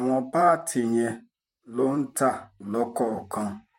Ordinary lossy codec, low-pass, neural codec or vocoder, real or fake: MP3, 64 kbps; 19.8 kHz; vocoder, 44.1 kHz, 128 mel bands, Pupu-Vocoder; fake